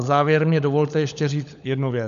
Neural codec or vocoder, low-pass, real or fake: codec, 16 kHz, 16 kbps, FunCodec, trained on LibriTTS, 50 frames a second; 7.2 kHz; fake